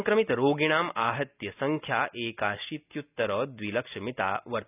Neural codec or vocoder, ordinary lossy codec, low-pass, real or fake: none; none; 3.6 kHz; real